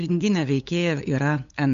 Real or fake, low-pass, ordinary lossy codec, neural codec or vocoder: fake; 7.2 kHz; MP3, 64 kbps; codec, 16 kHz, 8 kbps, FunCodec, trained on LibriTTS, 25 frames a second